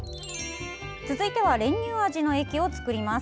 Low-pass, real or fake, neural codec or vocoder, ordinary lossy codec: none; real; none; none